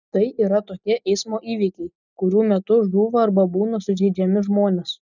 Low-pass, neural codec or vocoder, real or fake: 7.2 kHz; none; real